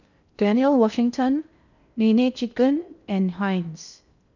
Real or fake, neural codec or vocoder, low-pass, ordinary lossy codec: fake; codec, 16 kHz in and 24 kHz out, 0.6 kbps, FocalCodec, streaming, 2048 codes; 7.2 kHz; none